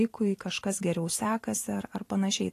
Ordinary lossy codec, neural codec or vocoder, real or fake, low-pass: AAC, 48 kbps; vocoder, 44.1 kHz, 128 mel bands every 512 samples, BigVGAN v2; fake; 14.4 kHz